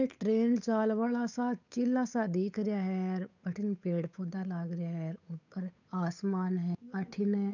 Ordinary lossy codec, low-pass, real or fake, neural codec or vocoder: none; 7.2 kHz; fake; codec, 16 kHz, 8 kbps, FunCodec, trained on Chinese and English, 25 frames a second